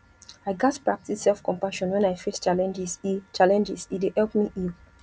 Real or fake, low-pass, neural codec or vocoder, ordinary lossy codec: real; none; none; none